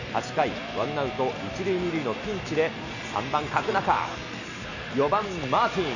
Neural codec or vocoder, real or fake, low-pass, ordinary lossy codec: none; real; 7.2 kHz; none